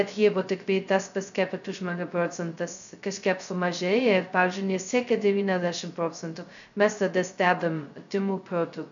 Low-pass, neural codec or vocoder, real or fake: 7.2 kHz; codec, 16 kHz, 0.2 kbps, FocalCodec; fake